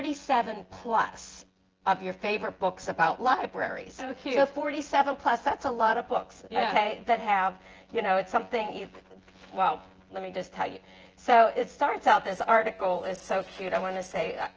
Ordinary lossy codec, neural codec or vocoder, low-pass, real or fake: Opus, 16 kbps; vocoder, 24 kHz, 100 mel bands, Vocos; 7.2 kHz; fake